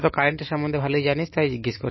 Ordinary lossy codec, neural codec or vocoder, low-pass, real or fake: MP3, 24 kbps; none; 7.2 kHz; real